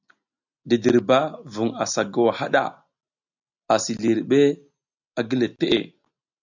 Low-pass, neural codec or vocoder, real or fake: 7.2 kHz; none; real